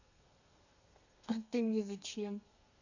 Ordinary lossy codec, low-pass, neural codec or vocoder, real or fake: none; 7.2 kHz; codec, 32 kHz, 1.9 kbps, SNAC; fake